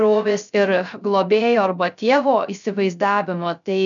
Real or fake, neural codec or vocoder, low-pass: fake; codec, 16 kHz, 0.3 kbps, FocalCodec; 7.2 kHz